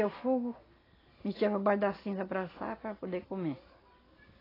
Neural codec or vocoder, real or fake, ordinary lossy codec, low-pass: none; real; AAC, 24 kbps; 5.4 kHz